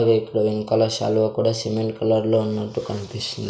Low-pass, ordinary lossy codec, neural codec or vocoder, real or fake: none; none; none; real